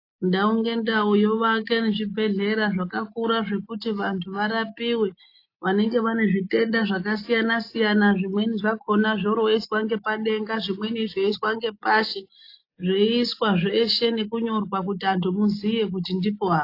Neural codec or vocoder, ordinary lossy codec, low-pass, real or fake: none; AAC, 32 kbps; 5.4 kHz; real